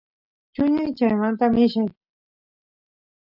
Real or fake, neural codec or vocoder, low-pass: real; none; 5.4 kHz